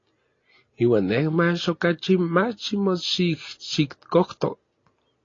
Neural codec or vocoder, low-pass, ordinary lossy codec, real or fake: none; 7.2 kHz; AAC, 32 kbps; real